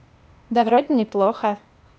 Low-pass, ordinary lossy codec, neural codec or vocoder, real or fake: none; none; codec, 16 kHz, 0.8 kbps, ZipCodec; fake